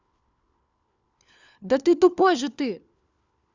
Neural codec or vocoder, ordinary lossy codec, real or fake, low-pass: codec, 16 kHz, 4 kbps, FunCodec, trained on LibriTTS, 50 frames a second; Opus, 64 kbps; fake; 7.2 kHz